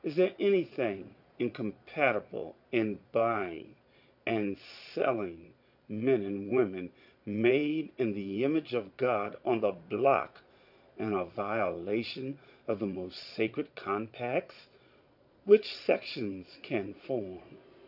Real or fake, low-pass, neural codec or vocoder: fake; 5.4 kHz; vocoder, 22.05 kHz, 80 mel bands, Vocos